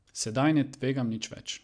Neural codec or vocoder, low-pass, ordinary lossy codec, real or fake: none; 9.9 kHz; AAC, 64 kbps; real